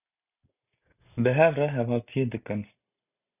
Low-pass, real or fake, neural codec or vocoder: 3.6 kHz; real; none